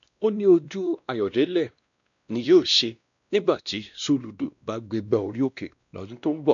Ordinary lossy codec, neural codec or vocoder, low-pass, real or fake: none; codec, 16 kHz, 1 kbps, X-Codec, WavLM features, trained on Multilingual LibriSpeech; 7.2 kHz; fake